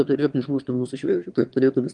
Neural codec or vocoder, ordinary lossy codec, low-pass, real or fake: autoencoder, 22.05 kHz, a latent of 192 numbers a frame, VITS, trained on one speaker; Opus, 24 kbps; 9.9 kHz; fake